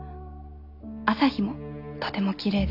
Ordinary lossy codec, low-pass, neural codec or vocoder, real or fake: none; 5.4 kHz; none; real